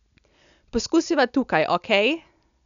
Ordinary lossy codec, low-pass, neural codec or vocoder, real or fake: none; 7.2 kHz; none; real